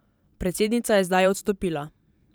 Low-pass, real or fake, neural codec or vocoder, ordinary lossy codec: none; fake; vocoder, 44.1 kHz, 128 mel bands every 512 samples, BigVGAN v2; none